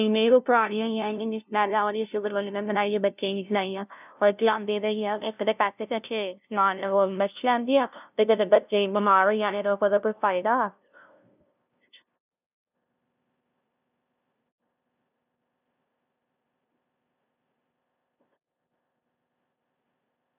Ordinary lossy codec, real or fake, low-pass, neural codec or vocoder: none; fake; 3.6 kHz; codec, 16 kHz, 0.5 kbps, FunCodec, trained on LibriTTS, 25 frames a second